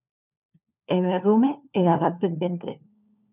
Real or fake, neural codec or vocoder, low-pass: fake; codec, 16 kHz, 4 kbps, FunCodec, trained on LibriTTS, 50 frames a second; 3.6 kHz